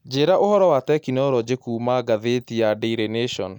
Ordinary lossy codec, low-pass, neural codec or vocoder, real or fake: none; 19.8 kHz; none; real